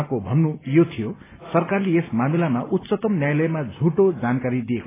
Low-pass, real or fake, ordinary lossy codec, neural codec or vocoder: 3.6 kHz; real; AAC, 16 kbps; none